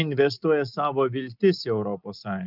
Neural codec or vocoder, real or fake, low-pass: none; real; 5.4 kHz